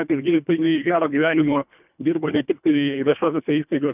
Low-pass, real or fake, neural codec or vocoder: 3.6 kHz; fake; codec, 24 kHz, 1.5 kbps, HILCodec